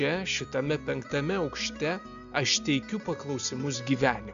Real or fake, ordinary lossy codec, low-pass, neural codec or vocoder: real; AAC, 96 kbps; 7.2 kHz; none